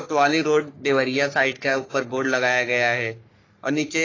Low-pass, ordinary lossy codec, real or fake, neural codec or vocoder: 7.2 kHz; AAC, 32 kbps; fake; codec, 44.1 kHz, 3.4 kbps, Pupu-Codec